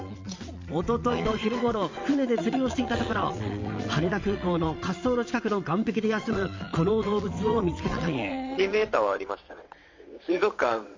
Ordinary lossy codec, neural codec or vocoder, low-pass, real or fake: MP3, 48 kbps; vocoder, 22.05 kHz, 80 mel bands, WaveNeXt; 7.2 kHz; fake